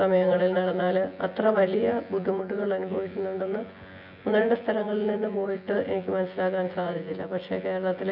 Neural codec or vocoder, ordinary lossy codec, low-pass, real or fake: vocoder, 24 kHz, 100 mel bands, Vocos; none; 5.4 kHz; fake